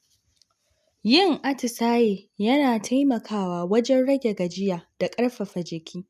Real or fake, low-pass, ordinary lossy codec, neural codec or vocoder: real; 14.4 kHz; none; none